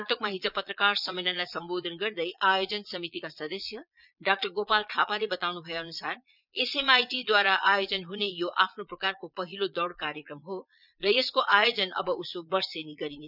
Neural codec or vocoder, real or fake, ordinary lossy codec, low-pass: vocoder, 22.05 kHz, 80 mel bands, Vocos; fake; none; 5.4 kHz